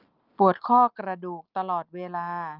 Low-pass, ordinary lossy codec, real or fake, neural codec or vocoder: 5.4 kHz; Opus, 24 kbps; real; none